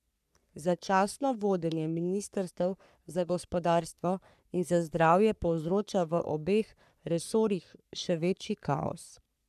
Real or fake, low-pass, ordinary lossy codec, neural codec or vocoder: fake; 14.4 kHz; none; codec, 44.1 kHz, 3.4 kbps, Pupu-Codec